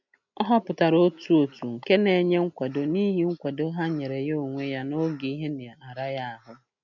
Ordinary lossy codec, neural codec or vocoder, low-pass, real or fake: none; none; 7.2 kHz; real